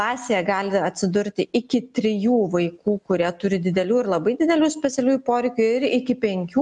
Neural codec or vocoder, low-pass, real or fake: none; 10.8 kHz; real